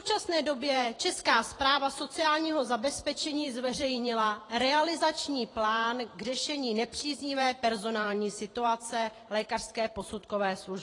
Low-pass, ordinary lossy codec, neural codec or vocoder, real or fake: 10.8 kHz; AAC, 32 kbps; vocoder, 44.1 kHz, 128 mel bands every 512 samples, BigVGAN v2; fake